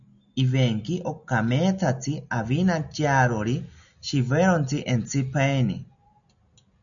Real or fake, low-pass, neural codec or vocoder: real; 7.2 kHz; none